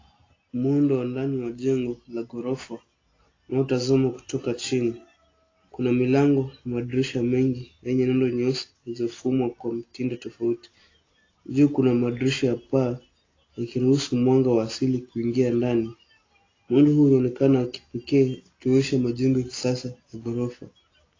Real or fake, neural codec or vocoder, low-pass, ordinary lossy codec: real; none; 7.2 kHz; AAC, 32 kbps